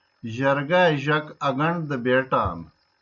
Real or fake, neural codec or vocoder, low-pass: real; none; 7.2 kHz